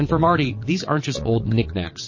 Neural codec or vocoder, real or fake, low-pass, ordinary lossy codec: vocoder, 22.05 kHz, 80 mel bands, WaveNeXt; fake; 7.2 kHz; MP3, 32 kbps